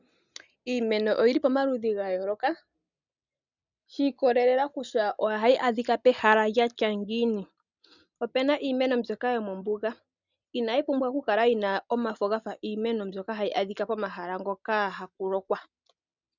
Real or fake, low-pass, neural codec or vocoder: real; 7.2 kHz; none